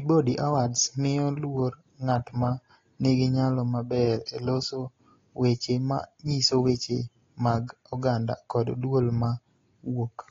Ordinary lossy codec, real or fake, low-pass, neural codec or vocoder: AAC, 32 kbps; real; 7.2 kHz; none